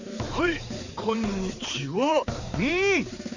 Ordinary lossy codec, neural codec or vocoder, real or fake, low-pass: none; codec, 16 kHz, 4 kbps, X-Codec, HuBERT features, trained on balanced general audio; fake; 7.2 kHz